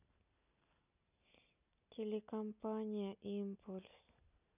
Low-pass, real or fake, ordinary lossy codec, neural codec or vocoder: 3.6 kHz; real; none; none